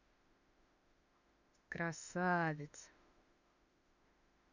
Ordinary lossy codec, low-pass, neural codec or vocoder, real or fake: none; 7.2 kHz; autoencoder, 48 kHz, 32 numbers a frame, DAC-VAE, trained on Japanese speech; fake